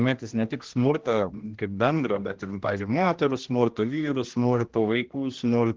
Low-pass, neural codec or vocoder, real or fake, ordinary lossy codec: 7.2 kHz; codec, 16 kHz, 1 kbps, X-Codec, HuBERT features, trained on general audio; fake; Opus, 16 kbps